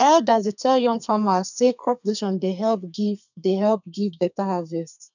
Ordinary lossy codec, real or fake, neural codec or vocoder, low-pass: none; fake; codec, 32 kHz, 1.9 kbps, SNAC; 7.2 kHz